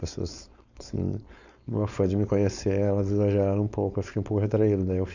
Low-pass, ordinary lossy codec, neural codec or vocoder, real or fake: 7.2 kHz; none; codec, 16 kHz, 4.8 kbps, FACodec; fake